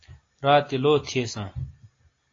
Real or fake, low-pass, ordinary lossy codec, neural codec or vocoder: real; 7.2 kHz; AAC, 32 kbps; none